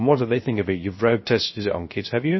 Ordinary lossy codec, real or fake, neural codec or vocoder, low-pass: MP3, 24 kbps; fake; codec, 16 kHz, 0.3 kbps, FocalCodec; 7.2 kHz